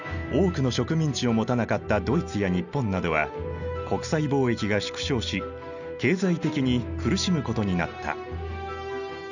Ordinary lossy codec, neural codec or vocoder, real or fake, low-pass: none; none; real; 7.2 kHz